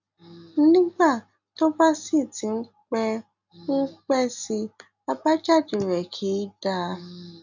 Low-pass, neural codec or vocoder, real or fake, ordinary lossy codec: 7.2 kHz; none; real; none